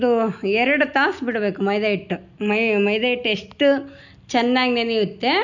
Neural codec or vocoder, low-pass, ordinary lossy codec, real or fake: none; 7.2 kHz; none; real